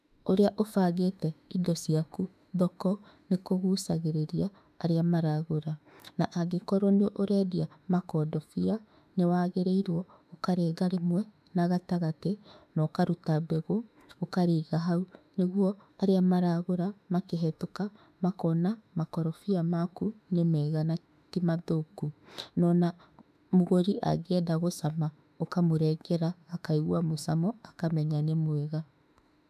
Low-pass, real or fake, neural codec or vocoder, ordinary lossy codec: 14.4 kHz; fake; autoencoder, 48 kHz, 32 numbers a frame, DAC-VAE, trained on Japanese speech; none